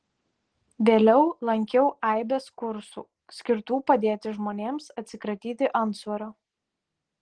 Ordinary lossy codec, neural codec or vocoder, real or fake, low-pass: Opus, 16 kbps; none; real; 9.9 kHz